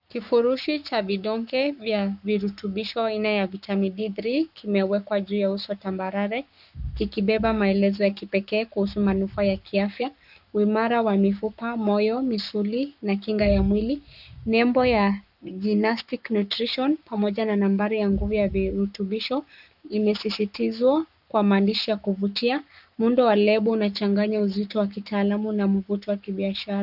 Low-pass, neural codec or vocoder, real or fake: 5.4 kHz; codec, 44.1 kHz, 7.8 kbps, Pupu-Codec; fake